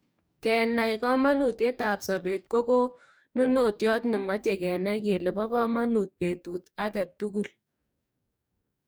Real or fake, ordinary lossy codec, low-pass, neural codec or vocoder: fake; none; none; codec, 44.1 kHz, 2.6 kbps, DAC